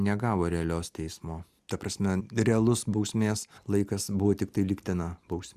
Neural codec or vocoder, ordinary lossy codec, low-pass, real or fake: none; Opus, 64 kbps; 14.4 kHz; real